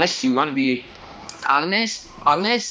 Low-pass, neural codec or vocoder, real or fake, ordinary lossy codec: none; codec, 16 kHz, 1 kbps, X-Codec, HuBERT features, trained on balanced general audio; fake; none